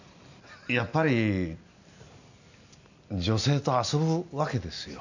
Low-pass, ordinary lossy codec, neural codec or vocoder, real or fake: 7.2 kHz; none; none; real